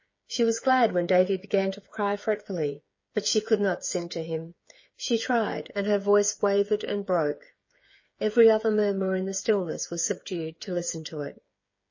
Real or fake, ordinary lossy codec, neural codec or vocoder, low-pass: fake; MP3, 32 kbps; codec, 16 kHz, 8 kbps, FreqCodec, smaller model; 7.2 kHz